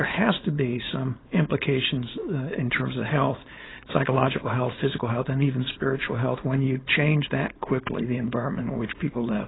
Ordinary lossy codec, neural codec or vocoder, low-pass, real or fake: AAC, 16 kbps; codec, 16 kHz, 4.8 kbps, FACodec; 7.2 kHz; fake